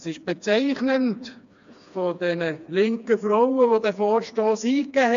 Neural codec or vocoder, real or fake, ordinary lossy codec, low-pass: codec, 16 kHz, 2 kbps, FreqCodec, smaller model; fake; AAC, 64 kbps; 7.2 kHz